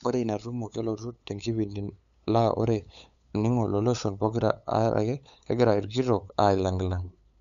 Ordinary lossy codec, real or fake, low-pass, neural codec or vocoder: none; fake; 7.2 kHz; codec, 16 kHz, 8 kbps, FunCodec, trained on LibriTTS, 25 frames a second